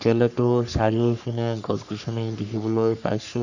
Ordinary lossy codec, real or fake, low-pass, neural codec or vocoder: none; fake; 7.2 kHz; codec, 44.1 kHz, 3.4 kbps, Pupu-Codec